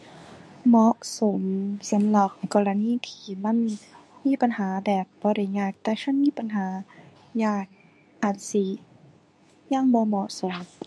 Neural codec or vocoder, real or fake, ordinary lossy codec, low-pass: codec, 24 kHz, 0.9 kbps, WavTokenizer, medium speech release version 2; fake; none; none